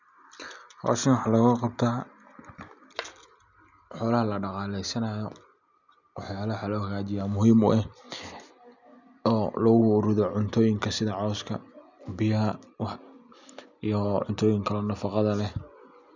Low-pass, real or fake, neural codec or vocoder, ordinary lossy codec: 7.2 kHz; real; none; none